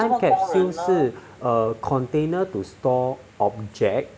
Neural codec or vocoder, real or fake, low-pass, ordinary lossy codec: none; real; none; none